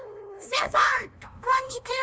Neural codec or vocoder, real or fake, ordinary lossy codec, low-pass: codec, 16 kHz, 0.5 kbps, FunCodec, trained on LibriTTS, 25 frames a second; fake; none; none